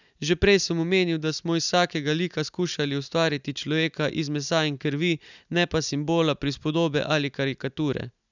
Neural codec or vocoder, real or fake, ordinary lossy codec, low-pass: none; real; none; 7.2 kHz